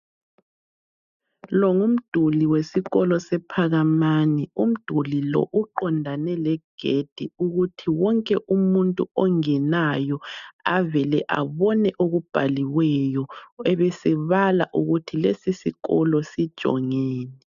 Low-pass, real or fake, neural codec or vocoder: 7.2 kHz; real; none